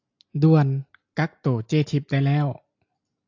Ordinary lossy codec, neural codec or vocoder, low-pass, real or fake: AAC, 48 kbps; none; 7.2 kHz; real